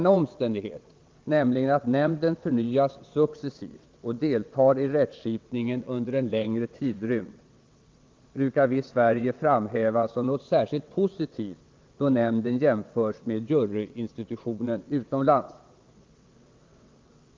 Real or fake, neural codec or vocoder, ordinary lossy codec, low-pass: fake; vocoder, 22.05 kHz, 80 mel bands, WaveNeXt; Opus, 24 kbps; 7.2 kHz